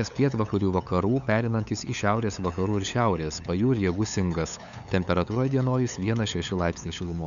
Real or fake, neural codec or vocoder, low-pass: fake; codec, 16 kHz, 8 kbps, FunCodec, trained on LibriTTS, 25 frames a second; 7.2 kHz